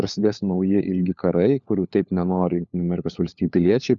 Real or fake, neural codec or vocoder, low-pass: fake; codec, 16 kHz, 4 kbps, FunCodec, trained on LibriTTS, 50 frames a second; 7.2 kHz